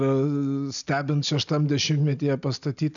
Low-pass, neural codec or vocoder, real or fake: 7.2 kHz; none; real